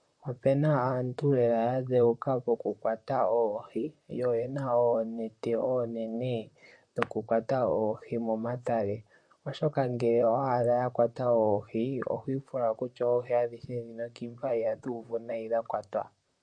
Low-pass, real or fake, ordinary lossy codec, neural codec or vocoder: 9.9 kHz; fake; MP3, 48 kbps; vocoder, 44.1 kHz, 128 mel bands, Pupu-Vocoder